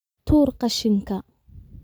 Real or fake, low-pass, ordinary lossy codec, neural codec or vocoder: real; none; none; none